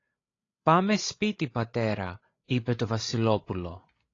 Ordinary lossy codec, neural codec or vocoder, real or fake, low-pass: AAC, 32 kbps; codec, 16 kHz, 16 kbps, FreqCodec, larger model; fake; 7.2 kHz